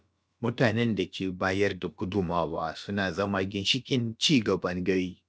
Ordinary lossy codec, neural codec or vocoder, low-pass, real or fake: none; codec, 16 kHz, about 1 kbps, DyCAST, with the encoder's durations; none; fake